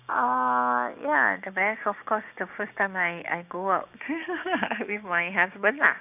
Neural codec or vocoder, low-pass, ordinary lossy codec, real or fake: codec, 16 kHz, 6 kbps, DAC; 3.6 kHz; none; fake